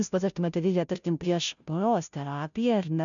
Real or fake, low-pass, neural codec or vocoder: fake; 7.2 kHz; codec, 16 kHz, 0.5 kbps, FunCodec, trained on Chinese and English, 25 frames a second